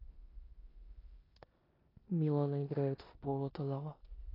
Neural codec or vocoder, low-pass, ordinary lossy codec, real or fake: codec, 16 kHz in and 24 kHz out, 0.9 kbps, LongCat-Audio-Codec, four codebook decoder; 5.4 kHz; AAC, 24 kbps; fake